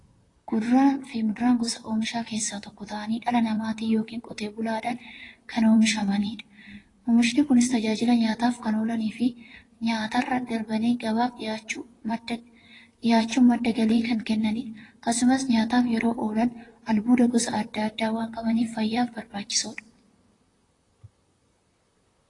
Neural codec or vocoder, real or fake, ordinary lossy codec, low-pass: vocoder, 44.1 kHz, 128 mel bands, Pupu-Vocoder; fake; AAC, 32 kbps; 10.8 kHz